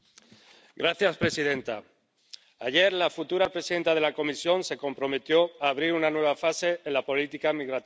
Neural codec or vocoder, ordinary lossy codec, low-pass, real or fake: none; none; none; real